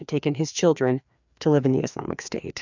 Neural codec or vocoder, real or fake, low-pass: codec, 16 kHz, 2 kbps, FreqCodec, larger model; fake; 7.2 kHz